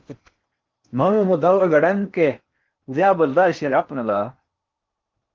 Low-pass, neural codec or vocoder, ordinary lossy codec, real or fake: 7.2 kHz; codec, 16 kHz in and 24 kHz out, 0.8 kbps, FocalCodec, streaming, 65536 codes; Opus, 32 kbps; fake